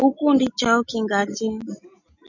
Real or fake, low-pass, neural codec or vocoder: real; 7.2 kHz; none